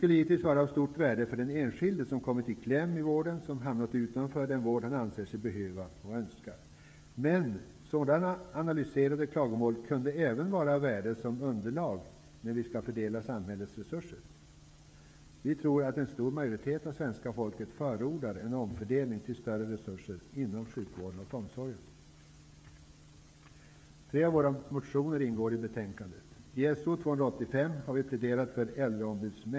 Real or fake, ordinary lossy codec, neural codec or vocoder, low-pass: fake; none; codec, 16 kHz, 16 kbps, FreqCodec, smaller model; none